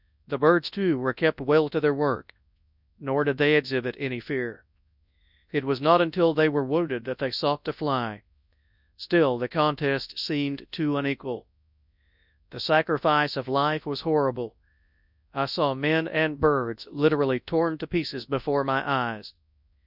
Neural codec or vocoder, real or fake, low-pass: codec, 24 kHz, 0.9 kbps, WavTokenizer, large speech release; fake; 5.4 kHz